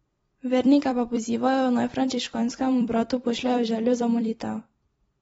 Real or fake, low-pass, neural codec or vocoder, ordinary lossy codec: fake; 19.8 kHz; vocoder, 44.1 kHz, 128 mel bands every 256 samples, BigVGAN v2; AAC, 24 kbps